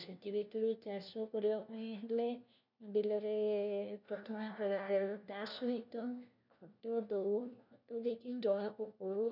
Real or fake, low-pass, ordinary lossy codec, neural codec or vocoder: fake; 5.4 kHz; none; codec, 16 kHz, 1 kbps, FunCodec, trained on LibriTTS, 50 frames a second